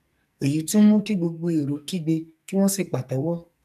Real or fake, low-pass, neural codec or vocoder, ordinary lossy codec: fake; 14.4 kHz; codec, 44.1 kHz, 2.6 kbps, SNAC; none